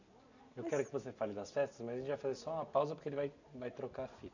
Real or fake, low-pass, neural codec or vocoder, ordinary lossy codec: real; 7.2 kHz; none; none